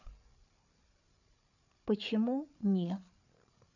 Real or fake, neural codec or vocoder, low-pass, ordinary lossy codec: fake; codec, 16 kHz, 8 kbps, FreqCodec, larger model; 7.2 kHz; none